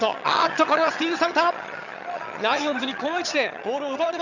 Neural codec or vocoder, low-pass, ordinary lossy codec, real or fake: vocoder, 22.05 kHz, 80 mel bands, HiFi-GAN; 7.2 kHz; none; fake